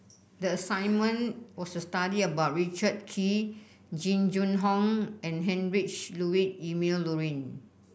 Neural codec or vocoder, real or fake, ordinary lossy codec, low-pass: none; real; none; none